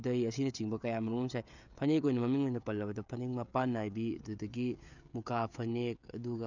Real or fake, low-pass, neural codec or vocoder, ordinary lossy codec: fake; 7.2 kHz; codec, 16 kHz, 16 kbps, FreqCodec, smaller model; none